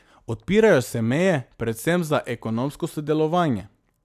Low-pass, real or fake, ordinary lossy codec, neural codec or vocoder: 14.4 kHz; real; AAC, 96 kbps; none